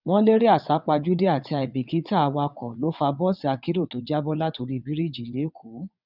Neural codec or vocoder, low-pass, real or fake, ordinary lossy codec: vocoder, 22.05 kHz, 80 mel bands, WaveNeXt; 5.4 kHz; fake; none